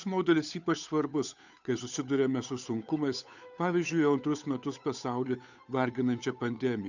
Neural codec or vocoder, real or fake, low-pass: codec, 16 kHz, 8 kbps, FunCodec, trained on Chinese and English, 25 frames a second; fake; 7.2 kHz